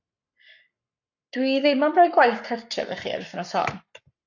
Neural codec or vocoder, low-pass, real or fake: codec, 44.1 kHz, 7.8 kbps, Pupu-Codec; 7.2 kHz; fake